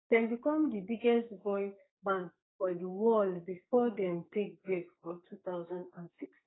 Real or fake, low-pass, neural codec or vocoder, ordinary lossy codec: fake; 7.2 kHz; codec, 44.1 kHz, 2.6 kbps, SNAC; AAC, 16 kbps